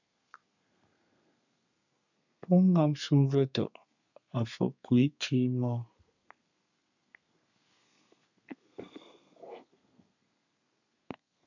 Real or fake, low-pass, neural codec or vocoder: fake; 7.2 kHz; codec, 32 kHz, 1.9 kbps, SNAC